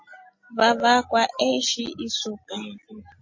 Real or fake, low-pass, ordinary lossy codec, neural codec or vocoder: real; 7.2 kHz; MP3, 32 kbps; none